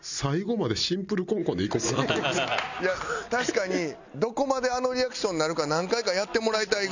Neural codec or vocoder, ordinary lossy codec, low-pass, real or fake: none; none; 7.2 kHz; real